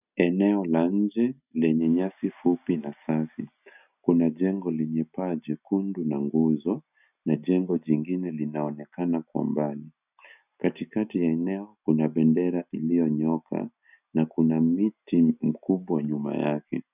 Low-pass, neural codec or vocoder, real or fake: 3.6 kHz; none; real